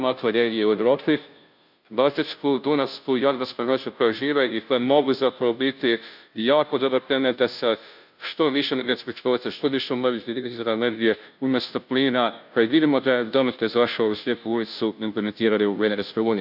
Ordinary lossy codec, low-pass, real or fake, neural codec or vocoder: none; 5.4 kHz; fake; codec, 16 kHz, 0.5 kbps, FunCodec, trained on Chinese and English, 25 frames a second